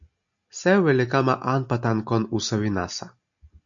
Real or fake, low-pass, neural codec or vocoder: real; 7.2 kHz; none